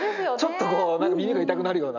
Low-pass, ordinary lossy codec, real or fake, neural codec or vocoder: 7.2 kHz; none; real; none